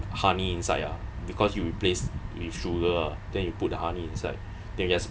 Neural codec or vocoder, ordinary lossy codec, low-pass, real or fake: none; none; none; real